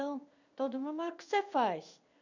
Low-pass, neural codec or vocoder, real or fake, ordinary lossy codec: 7.2 kHz; codec, 16 kHz in and 24 kHz out, 1 kbps, XY-Tokenizer; fake; none